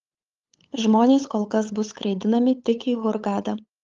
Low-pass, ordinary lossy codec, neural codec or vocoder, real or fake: 7.2 kHz; Opus, 32 kbps; codec, 16 kHz, 8 kbps, FunCodec, trained on LibriTTS, 25 frames a second; fake